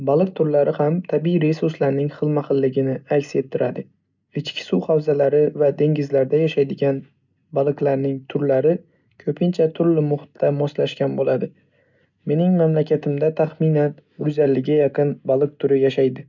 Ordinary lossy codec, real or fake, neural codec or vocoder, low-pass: none; real; none; 7.2 kHz